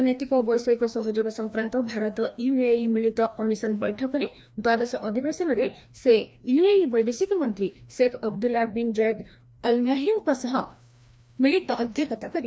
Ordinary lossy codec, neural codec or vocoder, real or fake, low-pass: none; codec, 16 kHz, 1 kbps, FreqCodec, larger model; fake; none